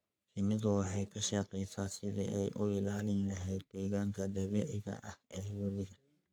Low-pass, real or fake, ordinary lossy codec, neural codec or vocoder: none; fake; none; codec, 44.1 kHz, 3.4 kbps, Pupu-Codec